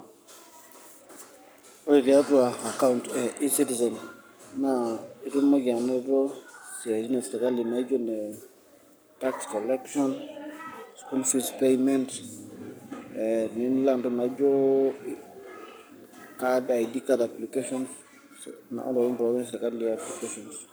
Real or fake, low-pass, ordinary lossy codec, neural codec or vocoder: fake; none; none; codec, 44.1 kHz, 7.8 kbps, Pupu-Codec